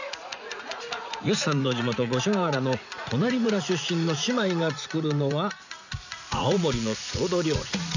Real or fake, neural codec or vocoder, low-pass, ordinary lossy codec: fake; vocoder, 44.1 kHz, 80 mel bands, Vocos; 7.2 kHz; none